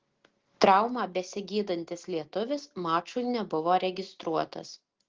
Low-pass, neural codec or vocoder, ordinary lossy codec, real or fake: 7.2 kHz; none; Opus, 16 kbps; real